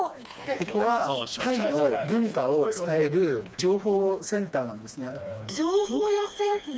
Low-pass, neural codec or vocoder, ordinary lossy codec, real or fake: none; codec, 16 kHz, 2 kbps, FreqCodec, smaller model; none; fake